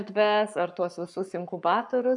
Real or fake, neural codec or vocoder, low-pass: fake; codec, 44.1 kHz, 7.8 kbps, Pupu-Codec; 10.8 kHz